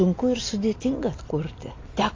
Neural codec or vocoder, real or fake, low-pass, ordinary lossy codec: none; real; 7.2 kHz; AAC, 32 kbps